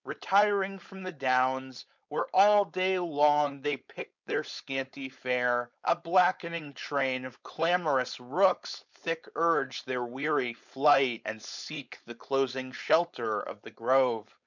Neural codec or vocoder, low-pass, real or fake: codec, 16 kHz, 4.8 kbps, FACodec; 7.2 kHz; fake